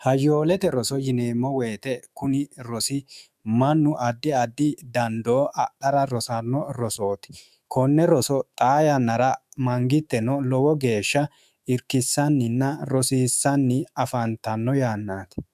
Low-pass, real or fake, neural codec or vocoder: 14.4 kHz; fake; autoencoder, 48 kHz, 128 numbers a frame, DAC-VAE, trained on Japanese speech